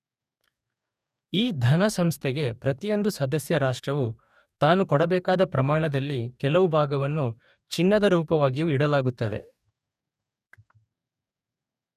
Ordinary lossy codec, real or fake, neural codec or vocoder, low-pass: none; fake; codec, 44.1 kHz, 2.6 kbps, DAC; 14.4 kHz